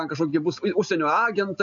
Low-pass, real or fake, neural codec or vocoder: 7.2 kHz; real; none